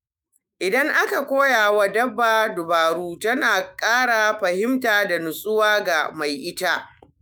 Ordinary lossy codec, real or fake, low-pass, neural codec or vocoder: none; fake; none; autoencoder, 48 kHz, 128 numbers a frame, DAC-VAE, trained on Japanese speech